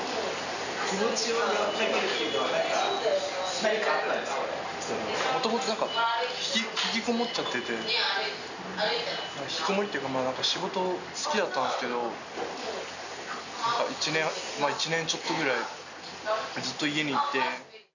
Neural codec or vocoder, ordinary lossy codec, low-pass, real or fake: none; none; 7.2 kHz; real